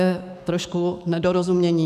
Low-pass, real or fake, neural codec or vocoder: 14.4 kHz; fake; autoencoder, 48 kHz, 128 numbers a frame, DAC-VAE, trained on Japanese speech